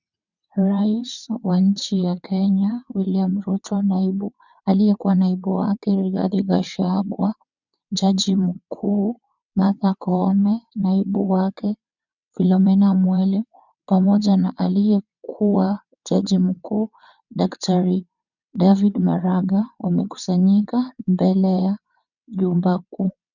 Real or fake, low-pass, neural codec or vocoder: fake; 7.2 kHz; vocoder, 22.05 kHz, 80 mel bands, WaveNeXt